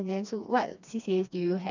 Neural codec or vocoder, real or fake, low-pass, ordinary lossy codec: codec, 16 kHz, 2 kbps, FreqCodec, smaller model; fake; 7.2 kHz; none